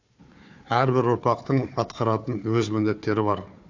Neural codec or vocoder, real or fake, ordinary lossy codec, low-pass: codec, 16 kHz, 4 kbps, FunCodec, trained on Chinese and English, 50 frames a second; fake; AAC, 48 kbps; 7.2 kHz